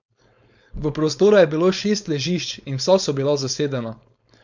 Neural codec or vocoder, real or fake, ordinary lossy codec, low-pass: codec, 16 kHz, 4.8 kbps, FACodec; fake; none; 7.2 kHz